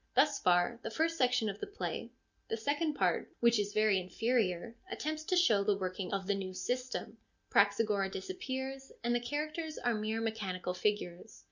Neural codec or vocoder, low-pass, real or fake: none; 7.2 kHz; real